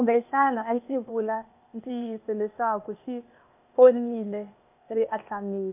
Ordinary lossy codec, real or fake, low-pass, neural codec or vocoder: AAC, 32 kbps; fake; 3.6 kHz; codec, 16 kHz, 0.8 kbps, ZipCodec